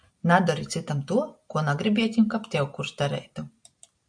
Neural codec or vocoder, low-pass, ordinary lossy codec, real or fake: none; 9.9 kHz; AAC, 64 kbps; real